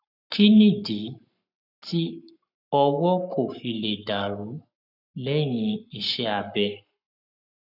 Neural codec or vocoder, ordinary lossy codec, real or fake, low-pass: codec, 44.1 kHz, 7.8 kbps, Pupu-Codec; none; fake; 5.4 kHz